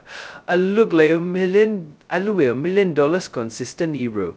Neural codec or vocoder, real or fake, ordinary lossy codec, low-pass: codec, 16 kHz, 0.2 kbps, FocalCodec; fake; none; none